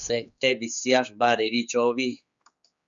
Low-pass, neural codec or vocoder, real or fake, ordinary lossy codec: 7.2 kHz; codec, 16 kHz, 4 kbps, X-Codec, HuBERT features, trained on general audio; fake; Opus, 64 kbps